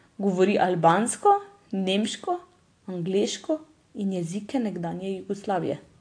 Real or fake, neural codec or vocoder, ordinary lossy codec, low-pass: real; none; AAC, 48 kbps; 9.9 kHz